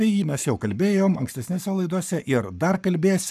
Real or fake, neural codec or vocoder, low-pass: fake; codec, 44.1 kHz, 7.8 kbps, Pupu-Codec; 14.4 kHz